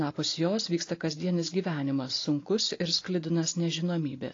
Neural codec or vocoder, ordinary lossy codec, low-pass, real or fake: none; AAC, 32 kbps; 7.2 kHz; real